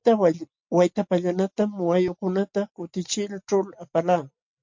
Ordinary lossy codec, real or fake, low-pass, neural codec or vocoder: MP3, 48 kbps; real; 7.2 kHz; none